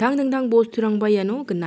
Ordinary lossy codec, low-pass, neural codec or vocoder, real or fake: none; none; none; real